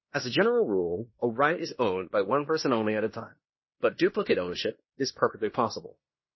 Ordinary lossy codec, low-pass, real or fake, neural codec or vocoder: MP3, 24 kbps; 7.2 kHz; fake; codec, 16 kHz in and 24 kHz out, 0.9 kbps, LongCat-Audio-Codec, fine tuned four codebook decoder